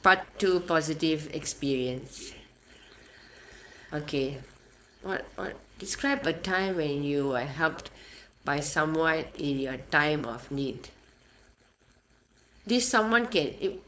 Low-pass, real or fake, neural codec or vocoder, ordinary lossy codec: none; fake; codec, 16 kHz, 4.8 kbps, FACodec; none